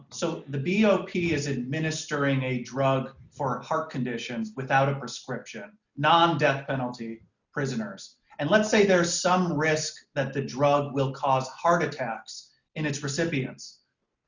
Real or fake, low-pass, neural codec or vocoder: real; 7.2 kHz; none